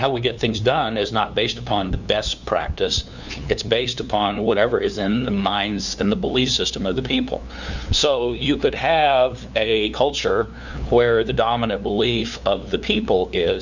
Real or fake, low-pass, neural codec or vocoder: fake; 7.2 kHz; codec, 16 kHz, 2 kbps, FunCodec, trained on LibriTTS, 25 frames a second